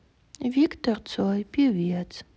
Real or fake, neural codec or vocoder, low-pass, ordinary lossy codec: real; none; none; none